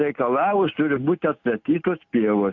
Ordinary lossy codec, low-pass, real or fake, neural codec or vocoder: AAC, 48 kbps; 7.2 kHz; real; none